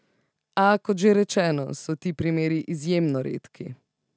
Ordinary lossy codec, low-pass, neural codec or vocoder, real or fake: none; none; none; real